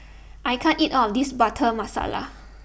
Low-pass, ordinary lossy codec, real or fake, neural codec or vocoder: none; none; real; none